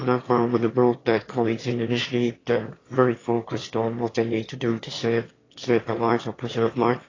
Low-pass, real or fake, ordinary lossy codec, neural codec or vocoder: 7.2 kHz; fake; AAC, 32 kbps; autoencoder, 22.05 kHz, a latent of 192 numbers a frame, VITS, trained on one speaker